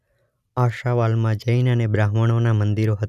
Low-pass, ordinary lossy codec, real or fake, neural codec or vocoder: 14.4 kHz; none; real; none